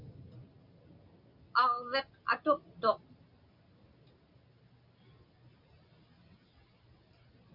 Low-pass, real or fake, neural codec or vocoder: 5.4 kHz; real; none